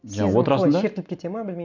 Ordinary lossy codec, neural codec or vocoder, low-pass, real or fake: none; none; 7.2 kHz; real